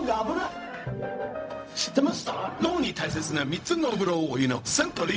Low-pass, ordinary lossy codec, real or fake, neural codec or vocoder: none; none; fake; codec, 16 kHz, 0.4 kbps, LongCat-Audio-Codec